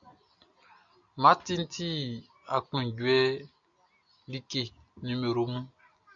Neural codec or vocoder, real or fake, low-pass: none; real; 7.2 kHz